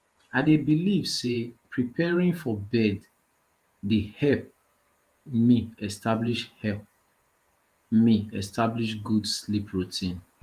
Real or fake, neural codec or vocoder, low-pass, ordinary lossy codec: fake; vocoder, 48 kHz, 128 mel bands, Vocos; 14.4 kHz; Opus, 32 kbps